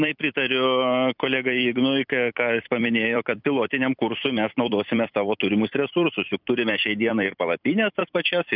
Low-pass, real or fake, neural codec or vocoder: 5.4 kHz; fake; vocoder, 44.1 kHz, 128 mel bands every 256 samples, BigVGAN v2